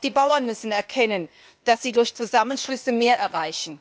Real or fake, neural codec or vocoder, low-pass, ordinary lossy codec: fake; codec, 16 kHz, 0.8 kbps, ZipCodec; none; none